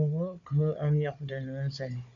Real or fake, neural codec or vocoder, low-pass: fake; codec, 16 kHz, 4 kbps, FunCodec, trained on Chinese and English, 50 frames a second; 7.2 kHz